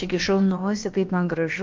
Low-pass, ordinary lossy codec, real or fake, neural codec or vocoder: 7.2 kHz; Opus, 24 kbps; fake; codec, 16 kHz, about 1 kbps, DyCAST, with the encoder's durations